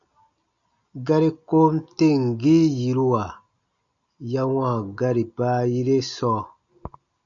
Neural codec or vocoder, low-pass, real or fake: none; 7.2 kHz; real